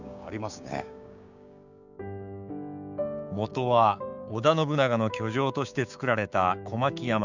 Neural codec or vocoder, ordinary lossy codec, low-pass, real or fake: codec, 16 kHz, 6 kbps, DAC; none; 7.2 kHz; fake